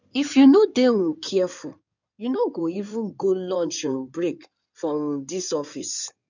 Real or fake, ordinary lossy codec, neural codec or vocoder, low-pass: fake; none; codec, 16 kHz in and 24 kHz out, 2.2 kbps, FireRedTTS-2 codec; 7.2 kHz